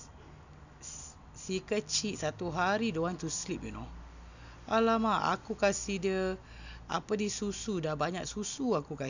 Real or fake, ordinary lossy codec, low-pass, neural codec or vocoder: real; none; 7.2 kHz; none